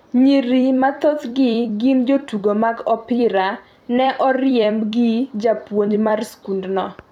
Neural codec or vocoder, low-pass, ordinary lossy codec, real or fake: vocoder, 44.1 kHz, 128 mel bands every 256 samples, BigVGAN v2; 19.8 kHz; none; fake